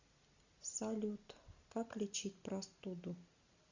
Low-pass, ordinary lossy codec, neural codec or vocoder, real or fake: 7.2 kHz; Opus, 64 kbps; none; real